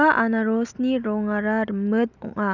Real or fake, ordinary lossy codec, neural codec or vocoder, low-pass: real; none; none; 7.2 kHz